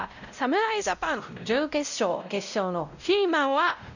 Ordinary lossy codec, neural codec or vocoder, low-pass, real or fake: none; codec, 16 kHz, 0.5 kbps, X-Codec, WavLM features, trained on Multilingual LibriSpeech; 7.2 kHz; fake